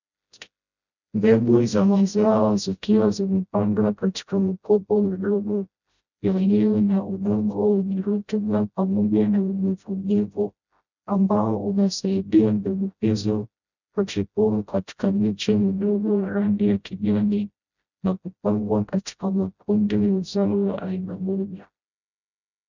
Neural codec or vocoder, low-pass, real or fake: codec, 16 kHz, 0.5 kbps, FreqCodec, smaller model; 7.2 kHz; fake